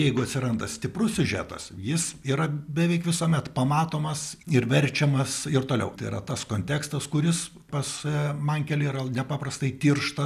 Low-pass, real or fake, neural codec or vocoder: 14.4 kHz; real; none